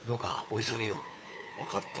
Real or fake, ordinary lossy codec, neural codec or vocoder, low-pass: fake; none; codec, 16 kHz, 2 kbps, FunCodec, trained on LibriTTS, 25 frames a second; none